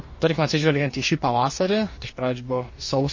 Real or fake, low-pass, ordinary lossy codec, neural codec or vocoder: fake; 7.2 kHz; MP3, 32 kbps; codec, 44.1 kHz, 2.6 kbps, DAC